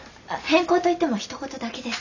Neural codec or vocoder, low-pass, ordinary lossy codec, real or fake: none; 7.2 kHz; none; real